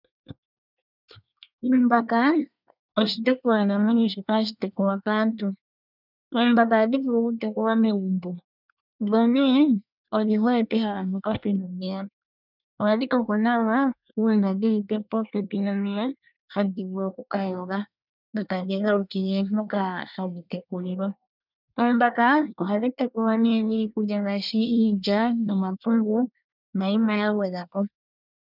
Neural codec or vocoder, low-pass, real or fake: codec, 24 kHz, 1 kbps, SNAC; 5.4 kHz; fake